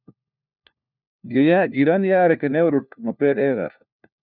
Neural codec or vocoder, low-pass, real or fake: codec, 16 kHz, 1 kbps, FunCodec, trained on LibriTTS, 50 frames a second; 5.4 kHz; fake